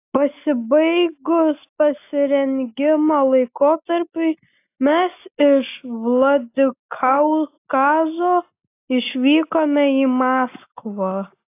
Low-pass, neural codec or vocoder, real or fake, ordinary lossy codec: 3.6 kHz; none; real; AAC, 24 kbps